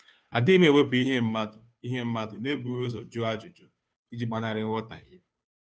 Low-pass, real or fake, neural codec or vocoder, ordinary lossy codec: none; fake; codec, 16 kHz, 2 kbps, FunCodec, trained on Chinese and English, 25 frames a second; none